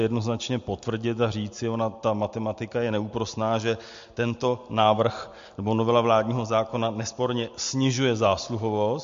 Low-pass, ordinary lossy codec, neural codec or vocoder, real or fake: 7.2 kHz; MP3, 48 kbps; none; real